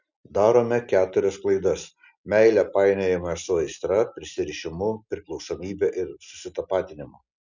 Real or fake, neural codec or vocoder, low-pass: real; none; 7.2 kHz